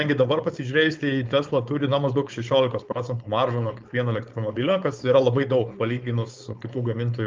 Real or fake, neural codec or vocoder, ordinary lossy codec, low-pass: fake; codec, 16 kHz, 4.8 kbps, FACodec; Opus, 24 kbps; 7.2 kHz